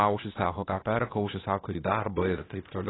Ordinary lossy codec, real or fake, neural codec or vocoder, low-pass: AAC, 16 kbps; fake; codec, 16 kHz, 0.8 kbps, ZipCodec; 7.2 kHz